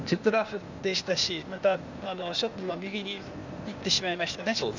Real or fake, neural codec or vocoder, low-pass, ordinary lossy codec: fake; codec, 16 kHz, 0.8 kbps, ZipCodec; 7.2 kHz; Opus, 64 kbps